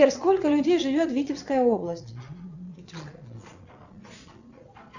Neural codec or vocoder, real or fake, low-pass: vocoder, 22.05 kHz, 80 mel bands, Vocos; fake; 7.2 kHz